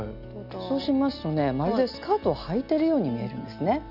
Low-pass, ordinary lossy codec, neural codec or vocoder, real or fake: 5.4 kHz; none; none; real